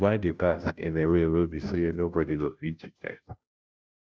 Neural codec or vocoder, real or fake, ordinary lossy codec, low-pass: codec, 16 kHz, 0.5 kbps, FunCodec, trained on Chinese and English, 25 frames a second; fake; none; none